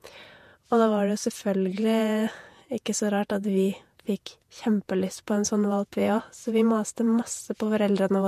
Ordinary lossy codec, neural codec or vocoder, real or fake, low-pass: MP3, 64 kbps; vocoder, 48 kHz, 128 mel bands, Vocos; fake; 14.4 kHz